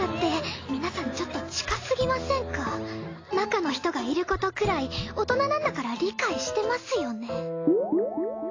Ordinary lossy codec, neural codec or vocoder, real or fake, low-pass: AAC, 32 kbps; none; real; 7.2 kHz